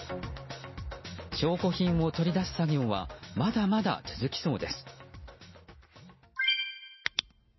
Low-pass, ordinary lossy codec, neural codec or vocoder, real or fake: 7.2 kHz; MP3, 24 kbps; none; real